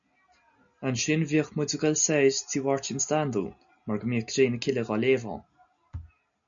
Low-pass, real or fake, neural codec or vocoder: 7.2 kHz; real; none